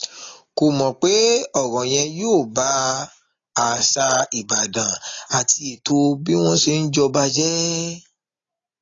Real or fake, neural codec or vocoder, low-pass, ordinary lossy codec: real; none; 7.2 kHz; AAC, 32 kbps